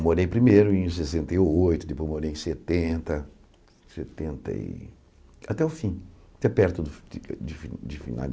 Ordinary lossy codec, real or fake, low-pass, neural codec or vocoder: none; real; none; none